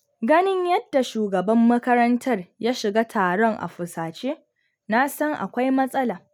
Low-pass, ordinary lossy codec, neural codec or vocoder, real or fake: 19.8 kHz; none; none; real